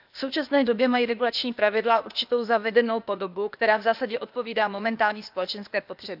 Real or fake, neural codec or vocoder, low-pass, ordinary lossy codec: fake; codec, 16 kHz, 0.8 kbps, ZipCodec; 5.4 kHz; none